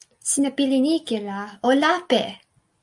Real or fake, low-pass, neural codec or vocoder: real; 10.8 kHz; none